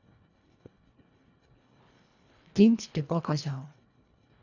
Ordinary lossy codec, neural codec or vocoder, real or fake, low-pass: none; codec, 24 kHz, 1.5 kbps, HILCodec; fake; 7.2 kHz